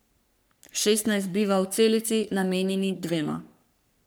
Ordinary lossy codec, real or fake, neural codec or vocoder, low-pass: none; fake; codec, 44.1 kHz, 3.4 kbps, Pupu-Codec; none